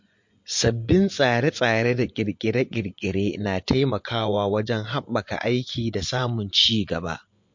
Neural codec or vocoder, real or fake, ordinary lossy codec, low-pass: vocoder, 24 kHz, 100 mel bands, Vocos; fake; MP3, 48 kbps; 7.2 kHz